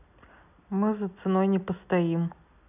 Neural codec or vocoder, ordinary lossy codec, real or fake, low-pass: none; none; real; 3.6 kHz